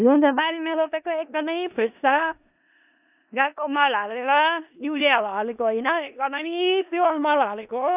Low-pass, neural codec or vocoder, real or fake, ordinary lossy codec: 3.6 kHz; codec, 16 kHz in and 24 kHz out, 0.4 kbps, LongCat-Audio-Codec, four codebook decoder; fake; none